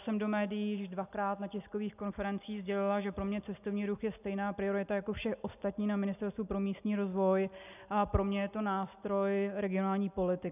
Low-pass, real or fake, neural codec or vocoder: 3.6 kHz; real; none